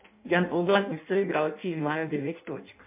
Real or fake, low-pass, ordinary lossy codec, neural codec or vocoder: fake; 3.6 kHz; MP3, 32 kbps; codec, 16 kHz in and 24 kHz out, 0.6 kbps, FireRedTTS-2 codec